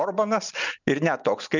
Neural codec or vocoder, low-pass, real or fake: none; 7.2 kHz; real